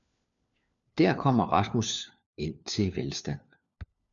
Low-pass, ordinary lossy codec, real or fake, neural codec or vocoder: 7.2 kHz; MP3, 96 kbps; fake; codec, 16 kHz, 4 kbps, FunCodec, trained on LibriTTS, 50 frames a second